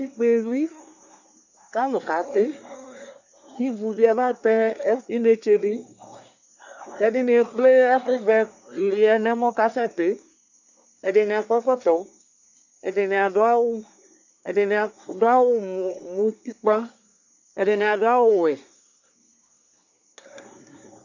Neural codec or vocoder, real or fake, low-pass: codec, 24 kHz, 1 kbps, SNAC; fake; 7.2 kHz